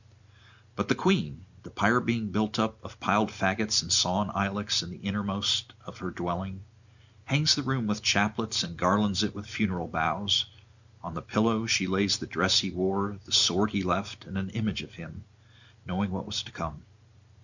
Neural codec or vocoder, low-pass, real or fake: none; 7.2 kHz; real